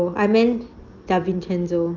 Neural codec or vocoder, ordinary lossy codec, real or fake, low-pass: none; Opus, 24 kbps; real; 7.2 kHz